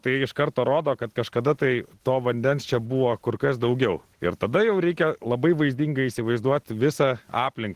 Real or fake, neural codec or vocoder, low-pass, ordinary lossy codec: real; none; 14.4 kHz; Opus, 16 kbps